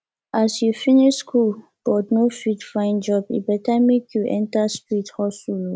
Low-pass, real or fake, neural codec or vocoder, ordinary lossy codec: none; real; none; none